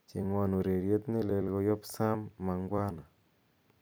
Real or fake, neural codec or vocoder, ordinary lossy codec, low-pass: fake; vocoder, 44.1 kHz, 128 mel bands every 256 samples, BigVGAN v2; none; none